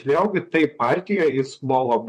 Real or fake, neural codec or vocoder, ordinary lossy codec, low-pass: fake; vocoder, 44.1 kHz, 128 mel bands, Pupu-Vocoder; MP3, 64 kbps; 14.4 kHz